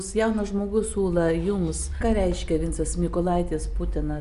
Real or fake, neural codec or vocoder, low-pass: real; none; 10.8 kHz